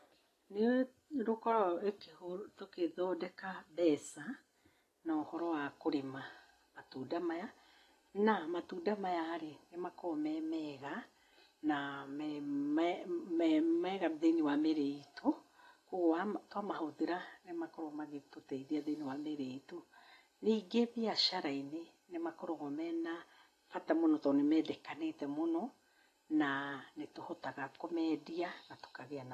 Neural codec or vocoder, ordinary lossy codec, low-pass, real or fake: none; AAC, 48 kbps; 14.4 kHz; real